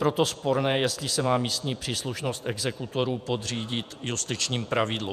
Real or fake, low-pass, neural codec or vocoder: fake; 14.4 kHz; vocoder, 48 kHz, 128 mel bands, Vocos